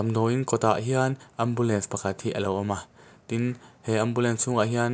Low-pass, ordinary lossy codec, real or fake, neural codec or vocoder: none; none; real; none